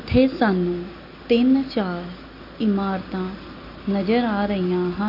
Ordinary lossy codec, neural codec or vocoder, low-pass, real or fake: none; none; 5.4 kHz; real